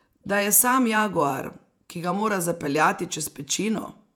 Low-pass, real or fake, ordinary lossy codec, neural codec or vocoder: 19.8 kHz; fake; none; vocoder, 48 kHz, 128 mel bands, Vocos